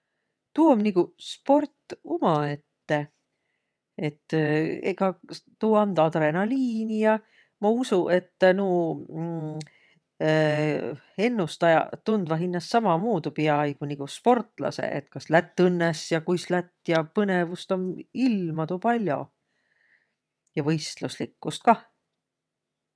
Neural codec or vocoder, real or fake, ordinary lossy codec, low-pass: vocoder, 22.05 kHz, 80 mel bands, WaveNeXt; fake; none; none